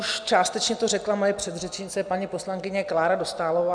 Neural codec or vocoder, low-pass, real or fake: none; 9.9 kHz; real